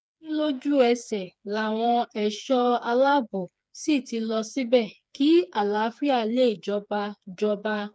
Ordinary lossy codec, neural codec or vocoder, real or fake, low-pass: none; codec, 16 kHz, 4 kbps, FreqCodec, smaller model; fake; none